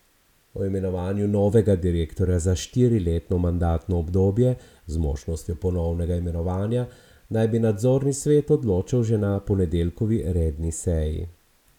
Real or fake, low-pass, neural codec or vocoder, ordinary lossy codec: real; 19.8 kHz; none; none